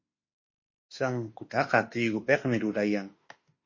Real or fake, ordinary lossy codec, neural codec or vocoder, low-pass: fake; MP3, 32 kbps; autoencoder, 48 kHz, 32 numbers a frame, DAC-VAE, trained on Japanese speech; 7.2 kHz